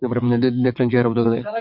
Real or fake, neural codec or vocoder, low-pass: fake; vocoder, 22.05 kHz, 80 mel bands, WaveNeXt; 5.4 kHz